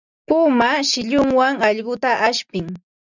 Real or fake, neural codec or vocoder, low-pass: real; none; 7.2 kHz